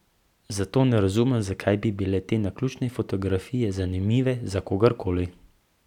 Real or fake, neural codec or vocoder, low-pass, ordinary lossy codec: real; none; 19.8 kHz; none